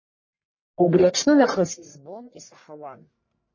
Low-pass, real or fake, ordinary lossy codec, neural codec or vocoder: 7.2 kHz; fake; MP3, 32 kbps; codec, 44.1 kHz, 1.7 kbps, Pupu-Codec